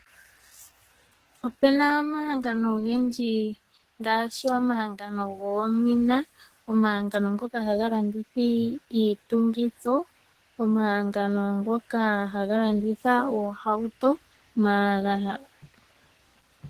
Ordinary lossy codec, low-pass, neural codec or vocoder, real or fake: Opus, 16 kbps; 14.4 kHz; codec, 44.1 kHz, 2.6 kbps, SNAC; fake